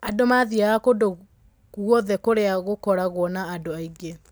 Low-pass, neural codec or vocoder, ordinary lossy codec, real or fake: none; none; none; real